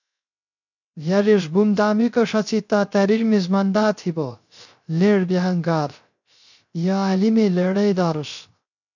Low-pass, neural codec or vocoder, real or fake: 7.2 kHz; codec, 16 kHz, 0.3 kbps, FocalCodec; fake